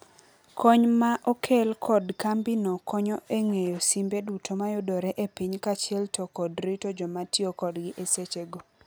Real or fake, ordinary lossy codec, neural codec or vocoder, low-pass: real; none; none; none